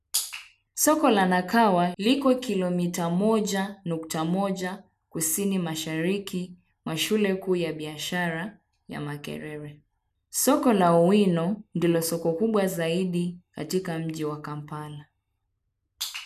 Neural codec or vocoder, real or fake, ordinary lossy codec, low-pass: none; real; none; 14.4 kHz